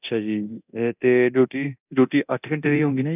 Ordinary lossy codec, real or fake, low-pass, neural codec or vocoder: none; fake; 3.6 kHz; codec, 24 kHz, 0.9 kbps, DualCodec